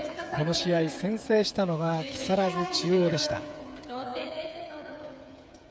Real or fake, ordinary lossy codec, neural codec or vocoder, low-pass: fake; none; codec, 16 kHz, 8 kbps, FreqCodec, smaller model; none